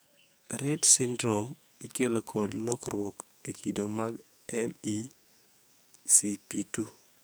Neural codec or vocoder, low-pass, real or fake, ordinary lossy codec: codec, 44.1 kHz, 2.6 kbps, SNAC; none; fake; none